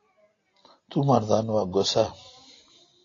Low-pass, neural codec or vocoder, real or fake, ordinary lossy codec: 7.2 kHz; none; real; AAC, 32 kbps